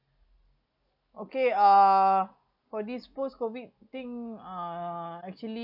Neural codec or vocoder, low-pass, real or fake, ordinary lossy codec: none; 5.4 kHz; real; none